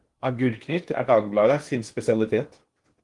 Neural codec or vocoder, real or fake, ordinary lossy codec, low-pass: codec, 16 kHz in and 24 kHz out, 0.8 kbps, FocalCodec, streaming, 65536 codes; fake; Opus, 24 kbps; 10.8 kHz